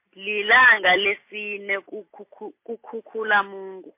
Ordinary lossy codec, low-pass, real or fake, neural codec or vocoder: MP3, 24 kbps; 3.6 kHz; real; none